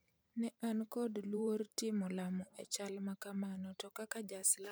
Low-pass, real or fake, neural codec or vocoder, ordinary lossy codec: none; fake; vocoder, 44.1 kHz, 128 mel bands every 512 samples, BigVGAN v2; none